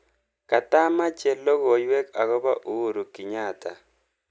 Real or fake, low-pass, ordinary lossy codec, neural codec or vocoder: real; none; none; none